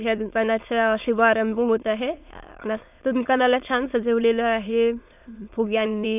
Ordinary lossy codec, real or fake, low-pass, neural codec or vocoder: none; fake; 3.6 kHz; autoencoder, 22.05 kHz, a latent of 192 numbers a frame, VITS, trained on many speakers